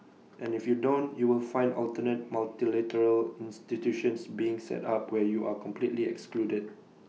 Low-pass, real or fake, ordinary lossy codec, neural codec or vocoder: none; real; none; none